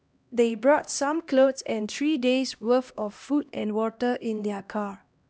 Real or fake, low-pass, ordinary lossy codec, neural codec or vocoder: fake; none; none; codec, 16 kHz, 1 kbps, X-Codec, HuBERT features, trained on LibriSpeech